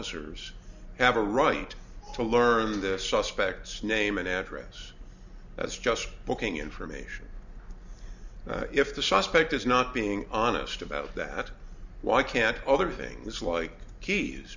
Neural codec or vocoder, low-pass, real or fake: none; 7.2 kHz; real